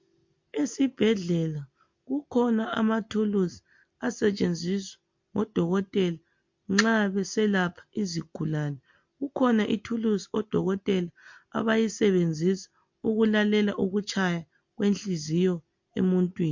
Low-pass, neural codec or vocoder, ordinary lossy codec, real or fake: 7.2 kHz; none; MP3, 48 kbps; real